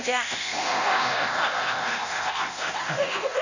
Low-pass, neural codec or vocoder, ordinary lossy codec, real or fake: 7.2 kHz; codec, 24 kHz, 0.9 kbps, DualCodec; none; fake